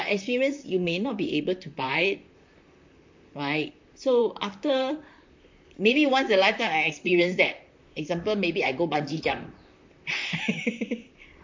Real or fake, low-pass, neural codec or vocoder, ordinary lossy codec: fake; 7.2 kHz; vocoder, 44.1 kHz, 128 mel bands, Pupu-Vocoder; MP3, 48 kbps